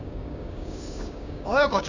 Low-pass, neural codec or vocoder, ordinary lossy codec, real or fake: 7.2 kHz; codec, 16 kHz, 6 kbps, DAC; none; fake